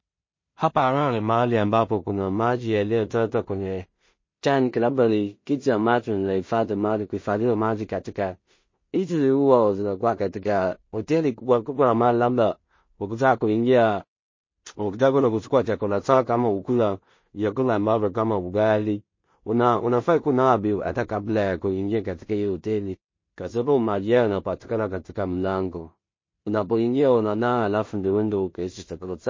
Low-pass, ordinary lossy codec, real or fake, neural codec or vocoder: 7.2 kHz; MP3, 32 kbps; fake; codec, 16 kHz in and 24 kHz out, 0.4 kbps, LongCat-Audio-Codec, two codebook decoder